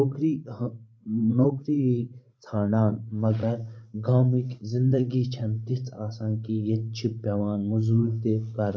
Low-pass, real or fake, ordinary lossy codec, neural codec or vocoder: none; fake; none; codec, 16 kHz, 16 kbps, FreqCodec, larger model